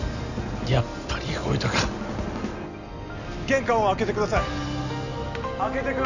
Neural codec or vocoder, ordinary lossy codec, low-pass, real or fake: none; none; 7.2 kHz; real